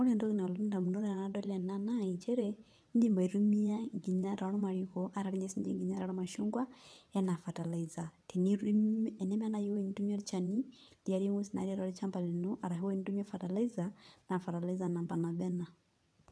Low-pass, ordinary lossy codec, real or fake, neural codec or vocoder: none; none; fake; vocoder, 22.05 kHz, 80 mel bands, WaveNeXt